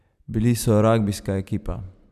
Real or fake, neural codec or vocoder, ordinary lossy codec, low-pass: real; none; none; 14.4 kHz